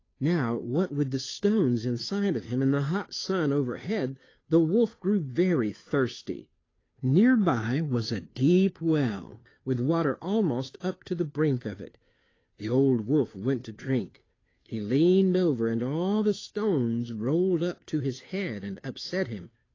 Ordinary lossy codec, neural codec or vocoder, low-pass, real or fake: AAC, 32 kbps; codec, 16 kHz, 2 kbps, FunCodec, trained on Chinese and English, 25 frames a second; 7.2 kHz; fake